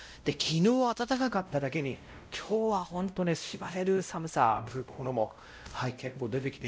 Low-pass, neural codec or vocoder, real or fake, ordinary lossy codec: none; codec, 16 kHz, 0.5 kbps, X-Codec, WavLM features, trained on Multilingual LibriSpeech; fake; none